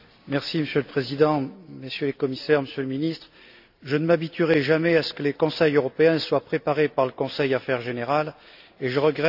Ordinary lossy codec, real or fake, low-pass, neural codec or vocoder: AAC, 48 kbps; real; 5.4 kHz; none